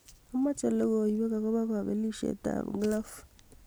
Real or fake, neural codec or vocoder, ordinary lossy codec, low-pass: real; none; none; none